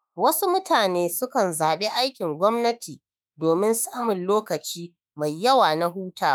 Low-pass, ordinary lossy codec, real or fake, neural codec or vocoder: none; none; fake; autoencoder, 48 kHz, 32 numbers a frame, DAC-VAE, trained on Japanese speech